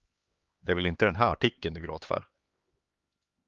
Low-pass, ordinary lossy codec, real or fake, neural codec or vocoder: 7.2 kHz; Opus, 32 kbps; fake; codec, 16 kHz, 2 kbps, X-Codec, HuBERT features, trained on LibriSpeech